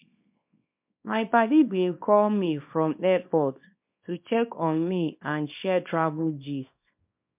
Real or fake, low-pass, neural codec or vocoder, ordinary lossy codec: fake; 3.6 kHz; codec, 24 kHz, 0.9 kbps, WavTokenizer, small release; MP3, 32 kbps